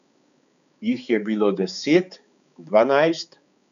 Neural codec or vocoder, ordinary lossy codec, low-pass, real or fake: codec, 16 kHz, 4 kbps, X-Codec, HuBERT features, trained on balanced general audio; none; 7.2 kHz; fake